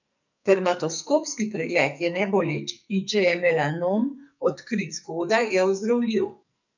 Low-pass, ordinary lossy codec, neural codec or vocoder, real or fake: 7.2 kHz; none; codec, 44.1 kHz, 2.6 kbps, SNAC; fake